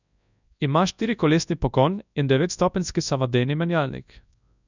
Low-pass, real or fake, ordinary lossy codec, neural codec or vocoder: 7.2 kHz; fake; none; codec, 24 kHz, 0.9 kbps, WavTokenizer, large speech release